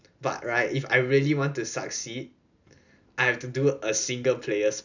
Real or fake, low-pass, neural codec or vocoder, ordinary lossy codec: real; 7.2 kHz; none; none